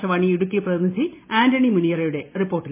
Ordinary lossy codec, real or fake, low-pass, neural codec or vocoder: MP3, 24 kbps; real; 3.6 kHz; none